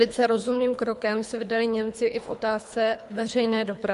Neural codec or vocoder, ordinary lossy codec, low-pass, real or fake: codec, 24 kHz, 3 kbps, HILCodec; MP3, 64 kbps; 10.8 kHz; fake